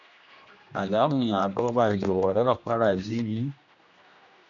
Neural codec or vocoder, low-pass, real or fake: codec, 16 kHz, 1 kbps, X-Codec, HuBERT features, trained on general audio; 7.2 kHz; fake